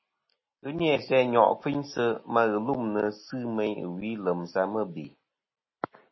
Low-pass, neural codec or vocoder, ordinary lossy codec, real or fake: 7.2 kHz; none; MP3, 24 kbps; real